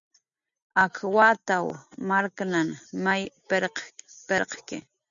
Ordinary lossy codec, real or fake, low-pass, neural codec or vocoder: MP3, 96 kbps; real; 7.2 kHz; none